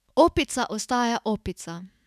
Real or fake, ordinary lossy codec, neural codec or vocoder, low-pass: fake; none; autoencoder, 48 kHz, 128 numbers a frame, DAC-VAE, trained on Japanese speech; 14.4 kHz